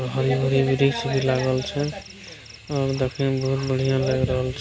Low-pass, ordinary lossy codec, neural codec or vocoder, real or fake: none; none; none; real